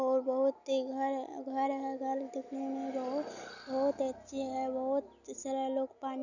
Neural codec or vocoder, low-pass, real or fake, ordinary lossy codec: none; 7.2 kHz; real; none